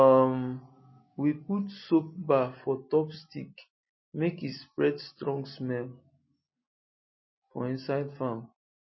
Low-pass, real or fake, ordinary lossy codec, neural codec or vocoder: 7.2 kHz; real; MP3, 24 kbps; none